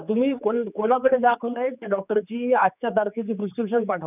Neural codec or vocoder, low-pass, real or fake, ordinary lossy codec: codec, 16 kHz, 4 kbps, X-Codec, HuBERT features, trained on general audio; 3.6 kHz; fake; none